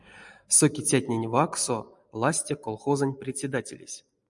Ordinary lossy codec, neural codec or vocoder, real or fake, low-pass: MP3, 96 kbps; none; real; 10.8 kHz